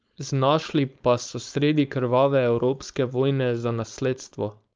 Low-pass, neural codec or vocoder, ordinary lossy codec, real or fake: 7.2 kHz; codec, 16 kHz, 4.8 kbps, FACodec; Opus, 24 kbps; fake